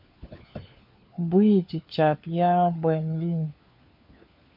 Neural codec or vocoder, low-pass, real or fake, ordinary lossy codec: codec, 16 kHz, 4 kbps, FunCodec, trained on LibriTTS, 50 frames a second; 5.4 kHz; fake; MP3, 48 kbps